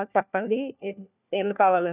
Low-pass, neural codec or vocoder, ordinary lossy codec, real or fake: 3.6 kHz; codec, 16 kHz, 1 kbps, FunCodec, trained on LibriTTS, 50 frames a second; none; fake